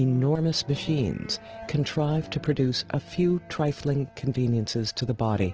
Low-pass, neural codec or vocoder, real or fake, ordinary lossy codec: 7.2 kHz; none; real; Opus, 16 kbps